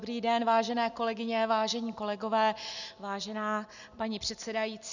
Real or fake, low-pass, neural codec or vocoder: real; 7.2 kHz; none